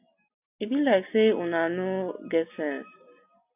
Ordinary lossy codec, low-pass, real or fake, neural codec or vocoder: AAC, 32 kbps; 3.6 kHz; real; none